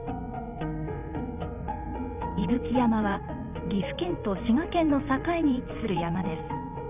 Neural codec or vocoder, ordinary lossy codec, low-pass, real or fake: vocoder, 22.05 kHz, 80 mel bands, WaveNeXt; none; 3.6 kHz; fake